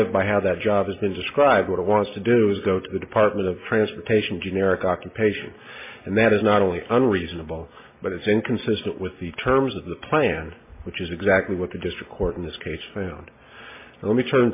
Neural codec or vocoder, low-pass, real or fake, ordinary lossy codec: none; 3.6 kHz; real; MP3, 24 kbps